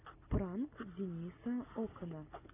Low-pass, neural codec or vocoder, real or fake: 3.6 kHz; none; real